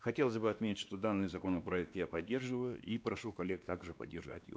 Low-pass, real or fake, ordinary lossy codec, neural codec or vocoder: none; fake; none; codec, 16 kHz, 2 kbps, X-Codec, WavLM features, trained on Multilingual LibriSpeech